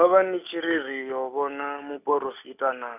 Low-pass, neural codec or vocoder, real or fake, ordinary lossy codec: 3.6 kHz; none; real; none